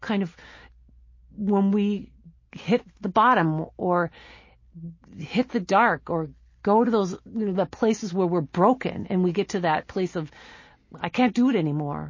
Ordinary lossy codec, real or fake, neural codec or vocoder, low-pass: MP3, 32 kbps; real; none; 7.2 kHz